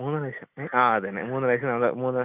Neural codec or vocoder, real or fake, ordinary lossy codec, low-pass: none; real; none; 3.6 kHz